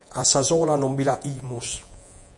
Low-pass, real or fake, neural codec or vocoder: 10.8 kHz; fake; vocoder, 48 kHz, 128 mel bands, Vocos